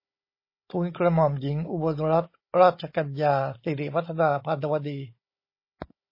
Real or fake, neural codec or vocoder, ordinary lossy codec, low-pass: fake; codec, 16 kHz, 16 kbps, FunCodec, trained on Chinese and English, 50 frames a second; MP3, 24 kbps; 5.4 kHz